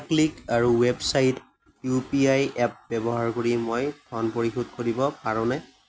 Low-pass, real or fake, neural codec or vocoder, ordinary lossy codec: none; real; none; none